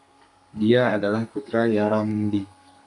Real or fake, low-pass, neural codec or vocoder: fake; 10.8 kHz; codec, 32 kHz, 1.9 kbps, SNAC